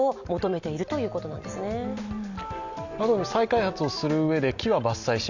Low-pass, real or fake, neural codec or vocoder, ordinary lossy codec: 7.2 kHz; real; none; none